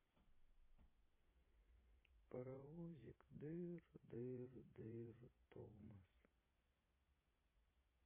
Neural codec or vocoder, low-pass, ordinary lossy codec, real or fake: vocoder, 22.05 kHz, 80 mel bands, Vocos; 3.6 kHz; MP3, 16 kbps; fake